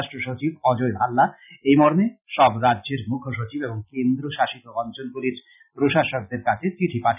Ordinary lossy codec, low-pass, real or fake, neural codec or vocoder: none; 3.6 kHz; real; none